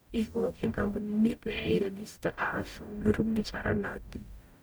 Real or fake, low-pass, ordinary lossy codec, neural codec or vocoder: fake; none; none; codec, 44.1 kHz, 0.9 kbps, DAC